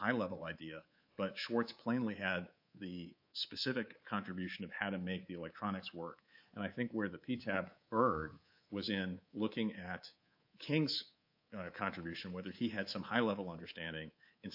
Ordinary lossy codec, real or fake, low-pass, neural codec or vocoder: AAC, 48 kbps; fake; 5.4 kHz; codec, 24 kHz, 3.1 kbps, DualCodec